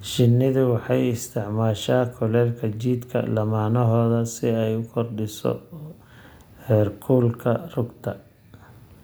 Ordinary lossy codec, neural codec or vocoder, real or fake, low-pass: none; none; real; none